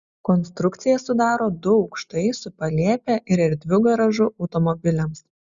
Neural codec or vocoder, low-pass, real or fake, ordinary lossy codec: none; 7.2 kHz; real; Opus, 64 kbps